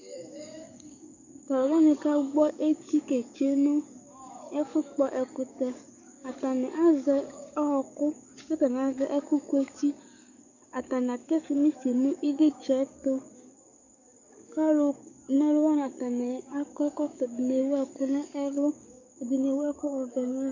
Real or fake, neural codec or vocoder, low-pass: fake; codec, 44.1 kHz, 7.8 kbps, DAC; 7.2 kHz